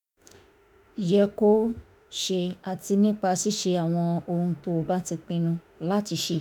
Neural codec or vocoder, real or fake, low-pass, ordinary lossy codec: autoencoder, 48 kHz, 32 numbers a frame, DAC-VAE, trained on Japanese speech; fake; none; none